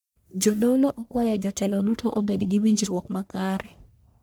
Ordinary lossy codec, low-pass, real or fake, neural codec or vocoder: none; none; fake; codec, 44.1 kHz, 1.7 kbps, Pupu-Codec